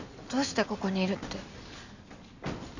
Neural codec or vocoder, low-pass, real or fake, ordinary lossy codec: none; 7.2 kHz; real; none